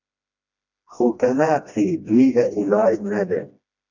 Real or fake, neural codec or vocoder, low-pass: fake; codec, 16 kHz, 1 kbps, FreqCodec, smaller model; 7.2 kHz